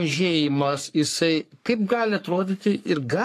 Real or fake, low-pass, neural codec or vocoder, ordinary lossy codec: fake; 14.4 kHz; codec, 44.1 kHz, 3.4 kbps, Pupu-Codec; AAC, 64 kbps